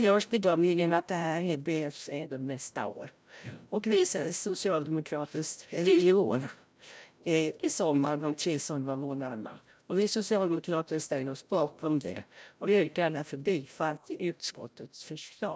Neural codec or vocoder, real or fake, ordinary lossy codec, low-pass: codec, 16 kHz, 0.5 kbps, FreqCodec, larger model; fake; none; none